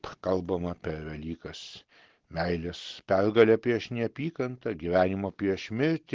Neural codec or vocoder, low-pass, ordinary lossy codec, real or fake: none; 7.2 kHz; Opus, 16 kbps; real